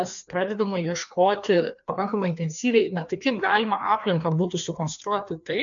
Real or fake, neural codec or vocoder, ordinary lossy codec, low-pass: fake; codec, 16 kHz, 2 kbps, FreqCodec, larger model; MP3, 64 kbps; 7.2 kHz